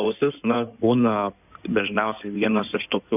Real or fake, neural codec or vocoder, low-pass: fake; codec, 16 kHz in and 24 kHz out, 2.2 kbps, FireRedTTS-2 codec; 3.6 kHz